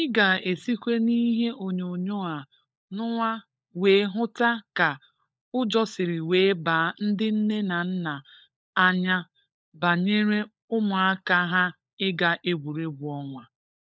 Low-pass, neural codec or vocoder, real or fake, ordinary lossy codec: none; codec, 16 kHz, 16 kbps, FunCodec, trained on LibriTTS, 50 frames a second; fake; none